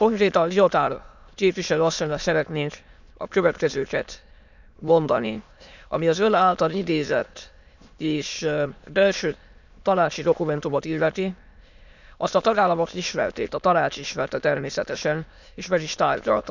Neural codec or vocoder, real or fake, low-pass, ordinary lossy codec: autoencoder, 22.05 kHz, a latent of 192 numbers a frame, VITS, trained on many speakers; fake; 7.2 kHz; none